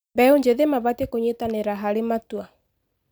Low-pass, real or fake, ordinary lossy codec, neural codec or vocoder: none; real; none; none